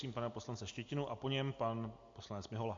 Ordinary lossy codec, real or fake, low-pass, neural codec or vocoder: MP3, 48 kbps; real; 7.2 kHz; none